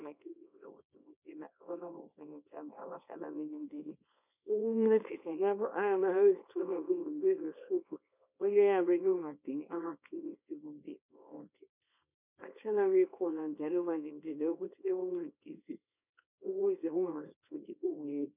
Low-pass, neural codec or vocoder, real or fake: 3.6 kHz; codec, 24 kHz, 0.9 kbps, WavTokenizer, small release; fake